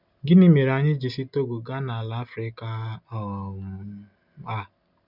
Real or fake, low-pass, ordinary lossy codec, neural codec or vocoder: real; 5.4 kHz; none; none